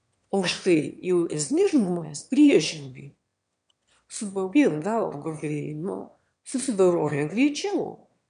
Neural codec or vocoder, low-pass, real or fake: autoencoder, 22.05 kHz, a latent of 192 numbers a frame, VITS, trained on one speaker; 9.9 kHz; fake